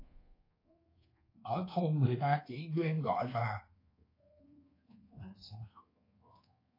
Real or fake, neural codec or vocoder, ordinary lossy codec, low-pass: fake; codec, 24 kHz, 1.2 kbps, DualCodec; AAC, 24 kbps; 5.4 kHz